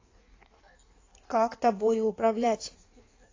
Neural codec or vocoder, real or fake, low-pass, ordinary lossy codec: codec, 16 kHz in and 24 kHz out, 1.1 kbps, FireRedTTS-2 codec; fake; 7.2 kHz; AAC, 32 kbps